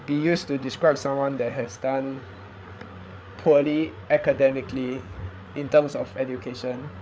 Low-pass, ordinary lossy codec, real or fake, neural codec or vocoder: none; none; fake; codec, 16 kHz, 8 kbps, FreqCodec, larger model